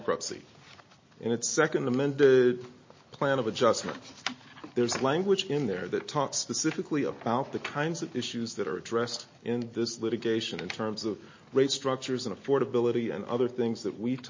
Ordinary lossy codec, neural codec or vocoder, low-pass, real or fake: MP3, 32 kbps; none; 7.2 kHz; real